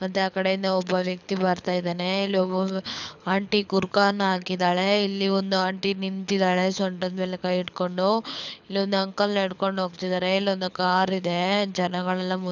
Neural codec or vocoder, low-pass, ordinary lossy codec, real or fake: codec, 24 kHz, 6 kbps, HILCodec; 7.2 kHz; none; fake